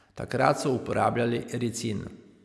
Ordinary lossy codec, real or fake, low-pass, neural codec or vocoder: none; real; none; none